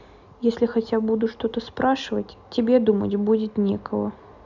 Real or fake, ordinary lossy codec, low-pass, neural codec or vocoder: real; none; 7.2 kHz; none